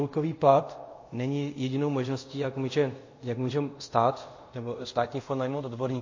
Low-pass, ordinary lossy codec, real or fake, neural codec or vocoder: 7.2 kHz; MP3, 32 kbps; fake; codec, 24 kHz, 0.5 kbps, DualCodec